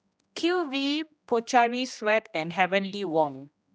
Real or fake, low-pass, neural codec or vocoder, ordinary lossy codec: fake; none; codec, 16 kHz, 1 kbps, X-Codec, HuBERT features, trained on general audio; none